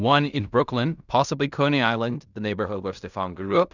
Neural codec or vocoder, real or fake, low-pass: codec, 16 kHz in and 24 kHz out, 0.4 kbps, LongCat-Audio-Codec, fine tuned four codebook decoder; fake; 7.2 kHz